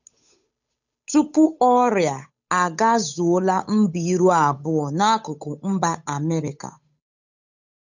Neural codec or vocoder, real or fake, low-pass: codec, 16 kHz, 8 kbps, FunCodec, trained on Chinese and English, 25 frames a second; fake; 7.2 kHz